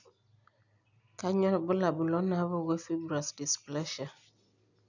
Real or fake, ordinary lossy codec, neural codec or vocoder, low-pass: real; none; none; 7.2 kHz